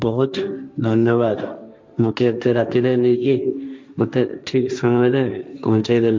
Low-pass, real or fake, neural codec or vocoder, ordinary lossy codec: none; fake; codec, 16 kHz, 1.1 kbps, Voila-Tokenizer; none